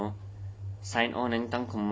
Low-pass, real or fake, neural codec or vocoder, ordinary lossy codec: none; real; none; none